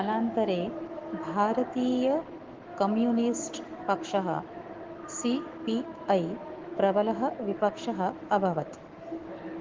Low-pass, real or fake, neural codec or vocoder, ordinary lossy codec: 7.2 kHz; fake; autoencoder, 48 kHz, 128 numbers a frame, DAC-VAE, trained on Japanese speech; Opus, 16 kbps